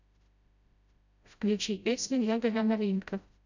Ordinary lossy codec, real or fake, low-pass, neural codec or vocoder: none; fake; 7.2 kHz; codec, 16 kHz, 0.5 kbps, FreqCodec, smaller model